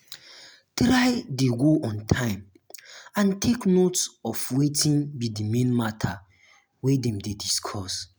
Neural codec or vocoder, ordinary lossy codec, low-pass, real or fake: none; none; none; real